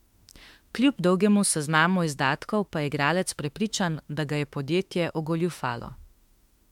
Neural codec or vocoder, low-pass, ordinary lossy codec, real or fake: autoencoder, 48 kHz, 32 numbers a frame, DAC-VAE, trained on Japanese speech; 19.8 kHz; MP3, 96 kbps; fake